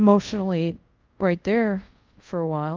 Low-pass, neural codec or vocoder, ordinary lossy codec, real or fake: 7.2 kHz; codec, 24 kHz, 0.5 kbps, DualCodec; Opus, 24 kbps; fake